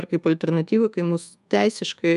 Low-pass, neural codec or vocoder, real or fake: 10.8 kHz; codec, 24 kHz, 1.2 kbps, DualCodec; fake